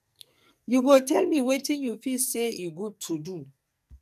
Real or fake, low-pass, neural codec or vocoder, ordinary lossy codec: fake; 14.4 kHz; codec, 44.1 kHz, 2.6 kbps, SNAC; none